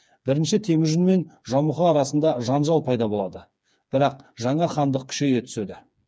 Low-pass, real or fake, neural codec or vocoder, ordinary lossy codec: none; fake; codec, 16 kHz, 4 kbps, FreqCodec, smaller model; none